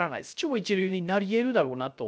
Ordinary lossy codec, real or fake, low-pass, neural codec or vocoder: none; fake; none; codec, 16 kHz, 0.3 kbps, FocalCodec